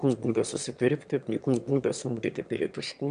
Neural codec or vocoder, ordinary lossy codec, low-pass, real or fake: autoencoder, 22.05 kHz, a latent of 192 numbers a frame, VITS, trained on one speaker; AAC, 96 kbps; 9.9 kHz; fake